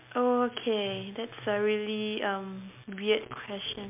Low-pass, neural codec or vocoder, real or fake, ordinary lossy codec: 3.6 kHz; none; real; MP3, 32 kbps